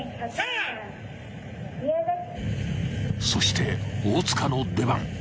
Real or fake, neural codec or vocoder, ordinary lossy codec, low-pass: real; none; none; none